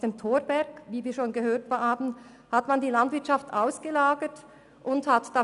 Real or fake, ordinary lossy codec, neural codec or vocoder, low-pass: real; none; none; 10.8 kHz